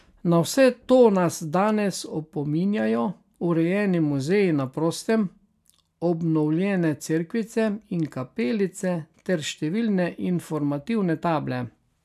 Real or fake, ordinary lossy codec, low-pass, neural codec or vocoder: real; none; 14.4 kHz; none